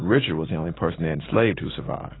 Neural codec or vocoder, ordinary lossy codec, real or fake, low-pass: none; AAC, 16 kbps; real; 7.2 kHz